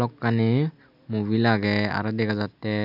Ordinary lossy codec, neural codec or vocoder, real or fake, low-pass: none; none; real; 5.4 kHz